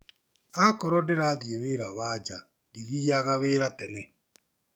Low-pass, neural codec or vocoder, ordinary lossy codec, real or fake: none; codec, 44.1 kHz, 7.8 kbps, DAC; none; fake